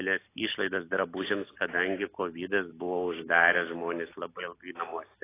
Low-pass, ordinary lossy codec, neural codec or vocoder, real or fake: 3.6 kHz; AAC, 16 kbps; none; real